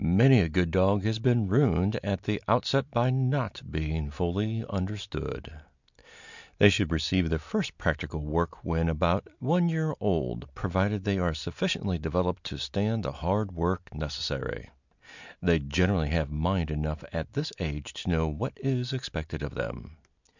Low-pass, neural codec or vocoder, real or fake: 7.2 kHz; none; real